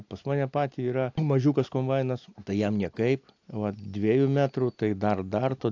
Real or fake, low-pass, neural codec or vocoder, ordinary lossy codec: real; 7.2 kHz; none; AAC, 48 kbps